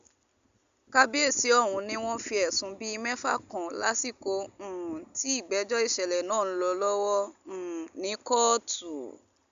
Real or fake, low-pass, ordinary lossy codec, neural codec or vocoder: real; 7.2 kHz; Opus, 64 kbps; none